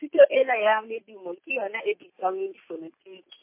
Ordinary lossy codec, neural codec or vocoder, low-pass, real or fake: MP3, 24 kbps; none; 3.6 kHz; real